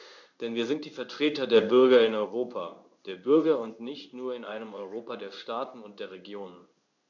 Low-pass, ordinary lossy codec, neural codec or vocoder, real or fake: 7.2 kHz; none; codec, 16 kHz in and 24 kHz out, 1 kbps, XY-Tokenizer; fake